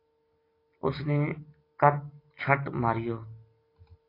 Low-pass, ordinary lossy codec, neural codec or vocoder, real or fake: 5.4 kHz; AAC, 24 kbps; none; real